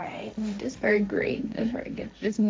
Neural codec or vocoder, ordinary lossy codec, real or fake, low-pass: codec, 16 kHz, 1.1 kbps, Voila-Tokenizer; none; fake; none